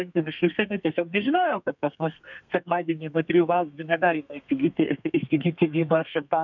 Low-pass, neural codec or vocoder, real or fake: 7.2 kHz; codec, 32 kHz, 1.9 kbps, SNAC; fake